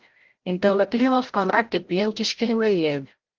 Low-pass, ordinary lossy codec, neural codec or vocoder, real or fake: 7.2 kHz; Opus, 16 kbps; codec, 16 kHz, 0.5 kbps, FreqCodec, larger model; fake